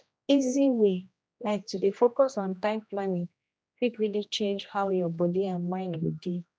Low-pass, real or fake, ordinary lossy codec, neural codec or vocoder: none; fake; none; codec, 16 kHz, 1 kbps, X-Codec, HuBERT features, trained on general audio